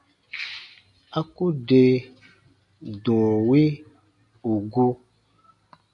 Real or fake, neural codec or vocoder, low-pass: real; none; 10.8 kHz